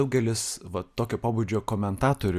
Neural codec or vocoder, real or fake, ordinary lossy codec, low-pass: none; real; Opus, 64 kbps; 14.4 kHz